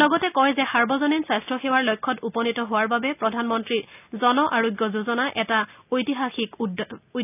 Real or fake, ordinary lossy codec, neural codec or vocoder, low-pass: real; none; none; 3.6 kHz